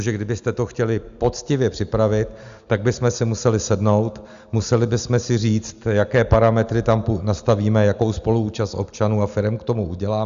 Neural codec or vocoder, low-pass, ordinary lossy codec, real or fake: none; 7.2 kHz; Opus, 64 kbps; real